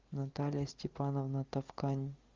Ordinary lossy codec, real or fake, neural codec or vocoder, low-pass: Opus, 32 kbps; real; none; 7.2 kHz